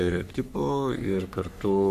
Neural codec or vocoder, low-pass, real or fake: codec, 44.1 kHz, 3.4 kbps, Pupu-Codec; 14.4 kHz; fake